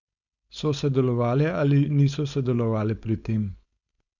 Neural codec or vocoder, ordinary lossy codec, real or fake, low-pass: codec, 16 kHz, 4.8 kbps, FACodec; none; fake; 7.2 kHz